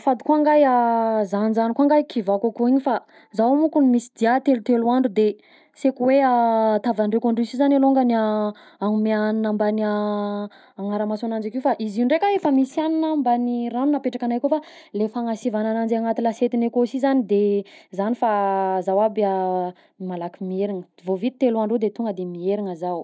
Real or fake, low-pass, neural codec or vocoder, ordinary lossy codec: real; none; none; none